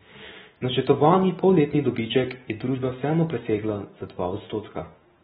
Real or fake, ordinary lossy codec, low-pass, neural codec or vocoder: real; AAC, 16 kbps; 19.8 kHz; none